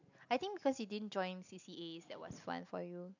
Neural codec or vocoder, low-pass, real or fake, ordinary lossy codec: none; 7.2 kHz; real; none